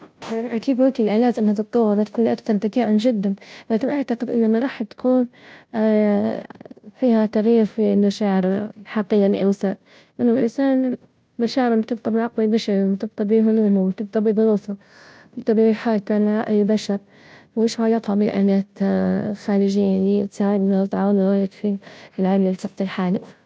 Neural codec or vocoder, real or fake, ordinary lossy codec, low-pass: codec, 16 kHz, 0.5 kbps, FunCodec, trained on Chinese and English, 25 frames a second; fake; none; none